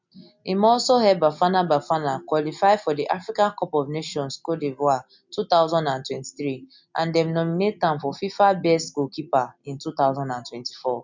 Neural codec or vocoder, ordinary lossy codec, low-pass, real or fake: none; MP3, 64 kbps; 7.2 kHz; real